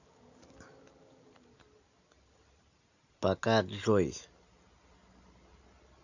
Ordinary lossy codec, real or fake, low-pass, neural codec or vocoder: none; real; 7.2 kHz; none